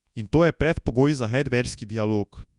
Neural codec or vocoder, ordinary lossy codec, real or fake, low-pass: codec, 24 kHz, 0.9 kbps, WavTokenizer, large speech release; Opus, 64 kbps; fake; 10.8 kHz